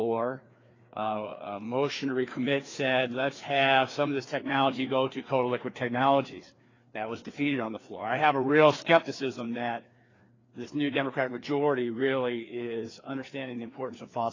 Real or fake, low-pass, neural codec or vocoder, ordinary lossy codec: fake; 7.2 kHz; codec, 16 kHz, 2 kbps, FreqCodec, larger model; AAC, 32 kbps